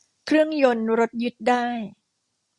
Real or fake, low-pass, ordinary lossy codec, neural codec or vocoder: real; 10.8 kHz; Opus, 64 kbps; none